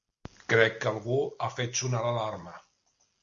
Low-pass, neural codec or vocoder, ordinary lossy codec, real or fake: 7.2 kHz; none; Opus, 64 kbps; real